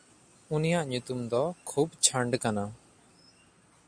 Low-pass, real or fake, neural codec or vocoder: 9.9 kHz; real; none